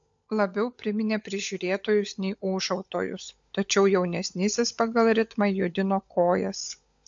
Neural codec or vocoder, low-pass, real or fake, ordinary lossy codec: codec, 16 kHz, 16 kbps, FunCodec, trained on LibriTTS, 50 frames a second; 7.2 kHz; fake; AAC, 64 kbps